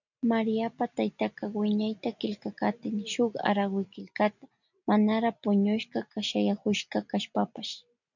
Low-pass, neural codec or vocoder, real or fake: 7.2 kHz; none; real